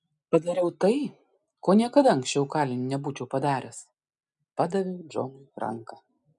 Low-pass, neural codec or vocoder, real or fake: 10.8 kHz; none; real